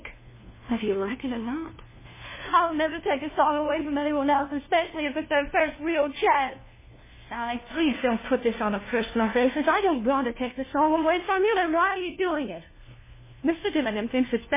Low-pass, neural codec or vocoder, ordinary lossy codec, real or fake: 3.6 kHz; codec, 16 kHz, 1 kbps, FunCodec, trained on LibriTTS, 50 frames a second; MP3, 16 kbps; fake